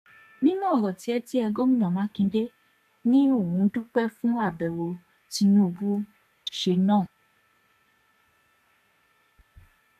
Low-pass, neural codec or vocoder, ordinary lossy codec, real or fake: 14.4 kHz; codec, 32 kHz, 1.9 kbps, SNAC; MP3, 96 kbps; fake